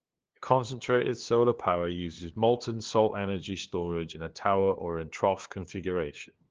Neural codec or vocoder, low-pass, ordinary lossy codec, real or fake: codec, 16 kHz, 2 kbps, FunCodec, trained on LibriTTS, 25 frames a second; 7.2 kHz; Opus, 16 kbps; fake